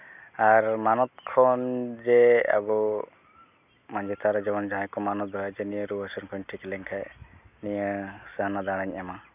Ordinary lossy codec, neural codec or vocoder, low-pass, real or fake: none; none; 3.6 kHz; real